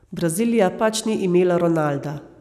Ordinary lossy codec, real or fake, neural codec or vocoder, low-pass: none; real; none; 14.4 kHz